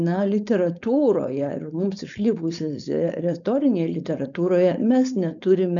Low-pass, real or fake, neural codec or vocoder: 7.2 kHz; fake; codec, 16 kHz, 4.8 kbps, FACodec